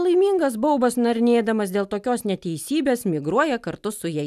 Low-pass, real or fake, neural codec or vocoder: 14.4 kHz; real; none